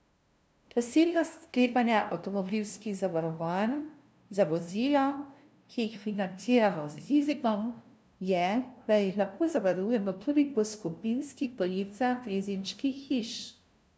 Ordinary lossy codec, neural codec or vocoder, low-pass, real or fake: none; codec, 16 kHz, 0.5 kbps, FunCodec, trained on LibriTTS, 25 frames a second; none; fake